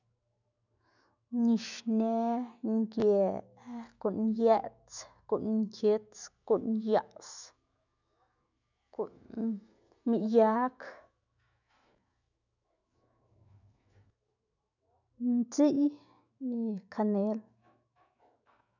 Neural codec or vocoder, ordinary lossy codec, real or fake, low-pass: autoencoder, 48 kHz, 128 numbers a frame, DAC-VAE, trained on Japanese speech; none; fake; 7.2 kHz